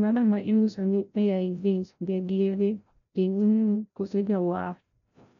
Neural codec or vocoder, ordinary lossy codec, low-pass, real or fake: codec, 16 kHz, 0.5 kbps, FreqCodec, larger model; none; 7.2 kHz; fake